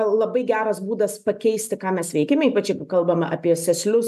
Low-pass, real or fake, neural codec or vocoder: 14.4 kHz; real; none